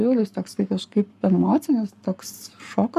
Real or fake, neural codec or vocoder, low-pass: fake; codec, 44.1 kHz, 7.8 kbps, Pupu-Codec; 14.4 kHz